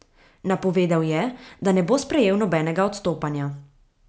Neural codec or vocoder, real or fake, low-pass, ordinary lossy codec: none; real; none; none